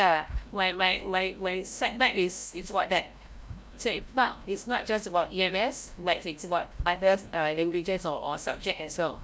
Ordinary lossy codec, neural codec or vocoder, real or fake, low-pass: none; codec, 16 kHz, 0.5 kbps, FreqCodec, larger model; fake; none